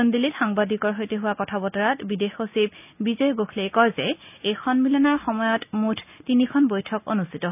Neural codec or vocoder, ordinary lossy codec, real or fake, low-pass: none; none; real; 3.6 kHz